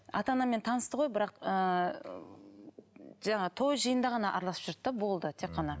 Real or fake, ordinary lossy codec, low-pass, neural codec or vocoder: real; none; none; none